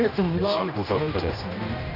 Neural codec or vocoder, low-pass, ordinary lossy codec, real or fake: codec, 16 kHz, 1 kbps, X-Codec, HuBERT features, trained on general audio; 5.4 kHz; none; fake